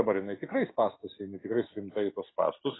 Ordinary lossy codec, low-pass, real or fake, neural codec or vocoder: AAC, 16 kbps; 7.2 kHz; real; none